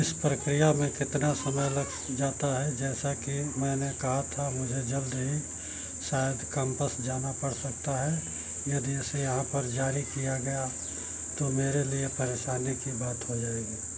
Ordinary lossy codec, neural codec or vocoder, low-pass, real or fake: none; none; none; real